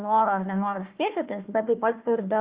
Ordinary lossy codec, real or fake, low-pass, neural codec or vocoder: Opus, 32 kbps; fake; 3.6 kHz; codec, 16 kHz, 1 kbps, FunCodec, trained on Chinese and English, 50 frames a second